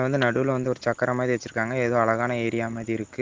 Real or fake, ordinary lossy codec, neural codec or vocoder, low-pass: real; Opus, 16 kbps; none; 7.2 kHz